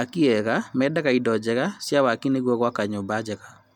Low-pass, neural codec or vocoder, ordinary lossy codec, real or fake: 19.8 kHz; none; none; real